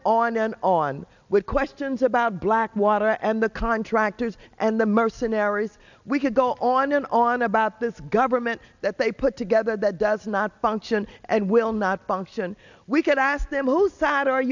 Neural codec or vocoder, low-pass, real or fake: none; 7.2 kHz; real